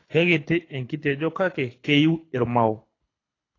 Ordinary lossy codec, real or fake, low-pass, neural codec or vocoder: AAC, 32 kbps; fake; 7.2 kHz; codec, 24 kHz, 6 kbps, HILCodec